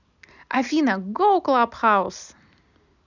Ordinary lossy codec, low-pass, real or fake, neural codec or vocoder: none; 7.2 kHz; real; none